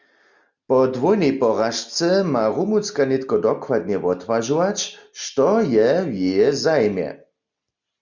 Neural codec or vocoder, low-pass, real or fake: none; 7.2 kHz; real